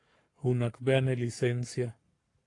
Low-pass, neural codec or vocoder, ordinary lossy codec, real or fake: 10.8 kHz; codec, 44.1 kHz, 7.8 kbps, Pupu-Codec; AAC, 48 kbps; fake